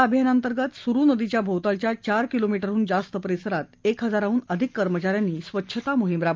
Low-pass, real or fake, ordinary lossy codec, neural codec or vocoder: 7.2 kHz; real; Opus, 24 kbps; none